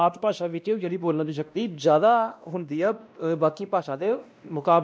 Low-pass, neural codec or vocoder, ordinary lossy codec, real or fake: none; codec, 16 kHz, 1 kbps, X-Codec, WavLM features, trained on Multilingual LibriSpeech; none; fake